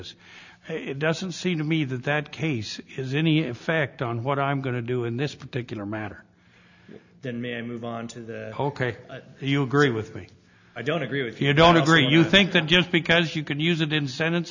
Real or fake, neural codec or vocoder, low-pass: real; none; 7.2 kHz